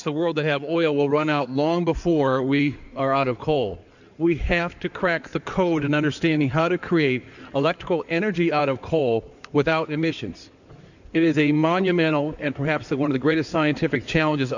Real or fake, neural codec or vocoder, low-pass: fake; codec, 16 kHz in and 24 kHz out, 2.2 kbps, FireRedTTS-2 codec; 7.2 kHz